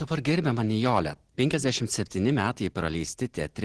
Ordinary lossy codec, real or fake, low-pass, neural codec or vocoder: Opus, 16 kbps; real; 10.8 kHz; none